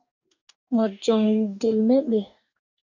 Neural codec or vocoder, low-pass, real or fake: codec, 44.1 kHz, 2.6 kbps, DAC; 7.2 kHz; fake